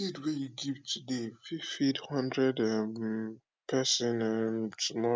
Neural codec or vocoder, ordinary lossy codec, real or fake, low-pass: none; none; real; none